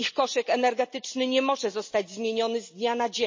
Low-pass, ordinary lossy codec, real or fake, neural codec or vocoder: 7.2 kHz; MP3, 32 kbps; real; none